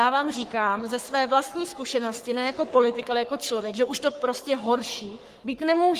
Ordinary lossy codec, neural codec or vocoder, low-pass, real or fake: Opus, 24 kbps; codec, 44.1 kHz, 3.4 kbps, Pupu-Codec; 14.4 kHz; fake